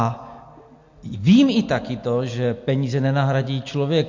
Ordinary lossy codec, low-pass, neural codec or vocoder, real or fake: MP3, 48 kbps; 7.2 kHz; none; real